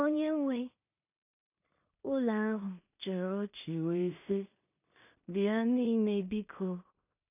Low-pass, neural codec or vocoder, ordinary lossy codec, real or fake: 3.6 kHz; codec, 16 kHz in and 24 kHz out, 0.4 kbps, LongCat-Audio-Codec, two codebook decoder; none; fake